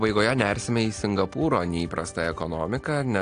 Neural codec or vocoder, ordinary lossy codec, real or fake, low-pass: none; AAC, 48 kbps; real; 9.9 kHz